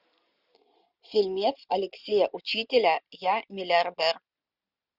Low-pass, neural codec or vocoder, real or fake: 5.4 kHz; none; real